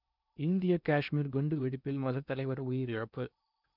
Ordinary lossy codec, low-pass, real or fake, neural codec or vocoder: none; 5.4 kHz; fake; codec, 16 kHz in and 24 kHz out, 0.8 kbps, FocalCodec, streaming, 65536 codes